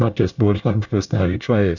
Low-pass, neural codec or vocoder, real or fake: 7.2 kHz; codec, 24 kHz, 1 kbps, SNAC; fake